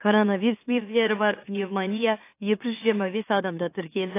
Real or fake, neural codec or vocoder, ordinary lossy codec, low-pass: fake; autoencoder, 44.1 kHz, a latent of 192 numbers a frame, MeloTTS; AAC, 24 kbps; 3.6 kHz